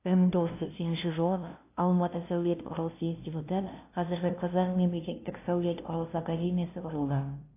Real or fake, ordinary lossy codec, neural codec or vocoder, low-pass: fake; AAC, 24 kbps; codec, 16 kHz, 0.5 kbps, FunCodec, trained on LibriTTS, 25 frames a second; 3.6 kHz